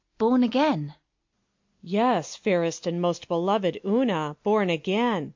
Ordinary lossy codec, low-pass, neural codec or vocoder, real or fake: MP3, 48 kbps; 7.2 kHz; none; real